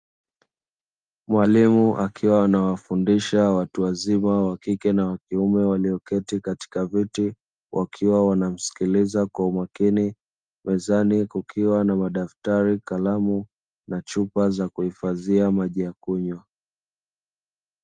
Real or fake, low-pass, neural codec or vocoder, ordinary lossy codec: real; 9.9 kHz; none; Opus, 32 kbps